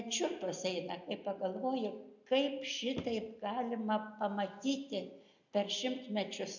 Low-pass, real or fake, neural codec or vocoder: 7.2 kHz; real; none